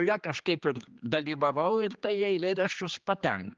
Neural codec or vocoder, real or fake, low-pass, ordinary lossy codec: codec, 16 kHz, 2 kbps, X-Codec, HuBERT features, trained on general audio; fake; 7.2 kHz; Opus, 24 kbps